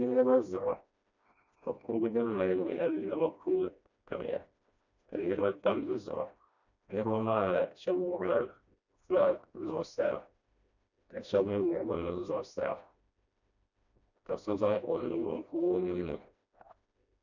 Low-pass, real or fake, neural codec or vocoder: 7.2 kHz; fake; codec, 16 kHz, 1 kbps, FreqCodec, smaller model